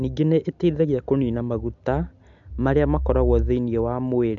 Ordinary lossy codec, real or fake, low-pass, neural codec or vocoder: MP3, 64 kbps; real; 7.2 kHz; none